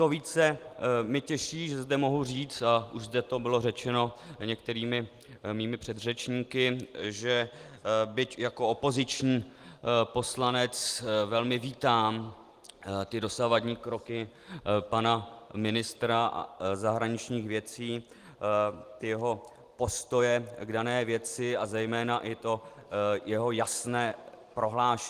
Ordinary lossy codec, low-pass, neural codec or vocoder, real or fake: Opus, 24 kbps; 14.4 kHz; none; real